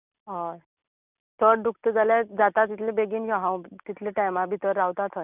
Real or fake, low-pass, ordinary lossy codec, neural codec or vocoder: real; 3.6 kHz; none; none